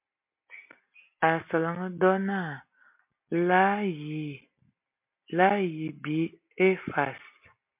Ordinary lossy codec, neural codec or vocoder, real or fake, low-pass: MP3, 32 kbps; none; real; 3.6 kHz